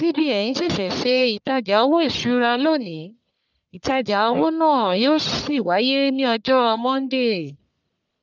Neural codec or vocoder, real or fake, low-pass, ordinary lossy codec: codec, 44.1 kHz, 1.7 kbps, Pupu-Codec; fake; 7.2 kHz; none